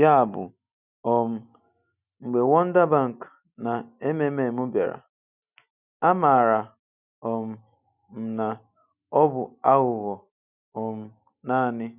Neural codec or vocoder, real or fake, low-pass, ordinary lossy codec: none; real; 3.6 kHz; none